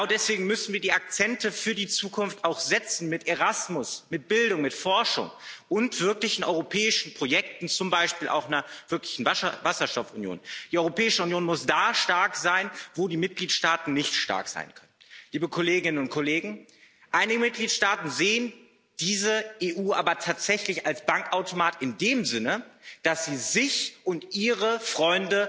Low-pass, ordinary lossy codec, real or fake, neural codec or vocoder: none; none; real; none